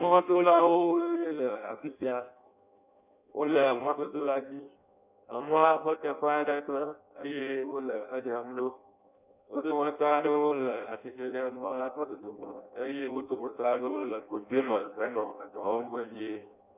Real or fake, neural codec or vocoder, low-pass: fake; codec, 16 kHz in and 24 kHz out, 0.6 kbps, FireRedTTS-2 codec; 3.6 kHz